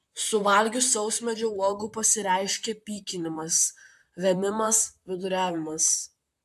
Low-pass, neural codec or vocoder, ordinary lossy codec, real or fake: 14.4 kHz; vocoder, 44.1 kHz, 128 mel bands, Pupu-Vocoder; AAC, 96 kbps; fake